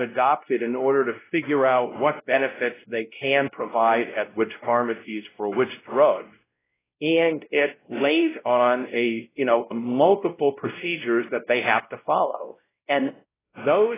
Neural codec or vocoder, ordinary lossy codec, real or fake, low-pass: codec, 16 kHz, 0.5 kbps, X-Codec, WavLM features, trained on Multilingual LibriSpeech; AAC, 16 kbps; fake; 3.6 kHz